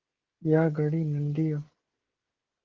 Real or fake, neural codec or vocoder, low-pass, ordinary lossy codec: fake; codec, 16 kHz, 16 kbps, FreqCodec, smaller model; 7.2 kHz; Opus, 16 kbps